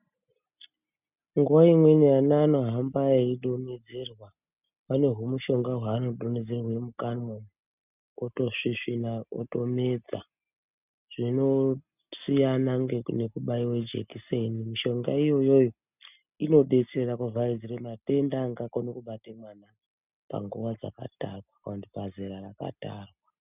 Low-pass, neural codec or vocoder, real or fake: 3.6 kHz; none; real